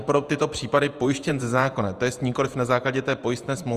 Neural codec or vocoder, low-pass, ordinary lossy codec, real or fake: vocoder, 48 kHz, 128 mel bands, Vocos; 14.4 kHz; Opus, 32 kbps; fake